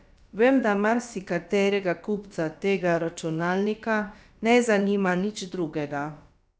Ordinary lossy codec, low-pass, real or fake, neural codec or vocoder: none; none; fake; codec, 16 kHz, about 1 kbps, DyCAST, with the encoder's durations